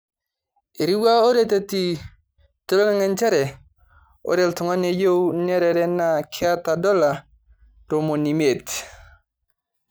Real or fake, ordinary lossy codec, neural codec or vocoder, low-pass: real; none; none; none